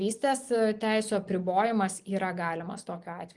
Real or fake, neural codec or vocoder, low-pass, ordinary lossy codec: real; none; 10.8 kHz; Opus, 24 kbps